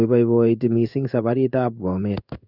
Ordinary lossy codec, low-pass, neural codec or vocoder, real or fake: none; 5.4 kHz; codec, 16 kHz in and 24 kHz out, 1 kbps, XY-Tokenizer; fake